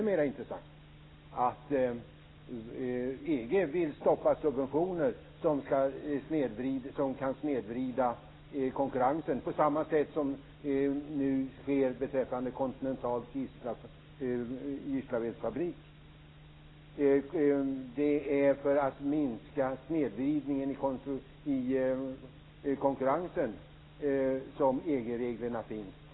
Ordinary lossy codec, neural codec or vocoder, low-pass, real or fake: AAC, 16 kbps; none; 7.2 kHz; real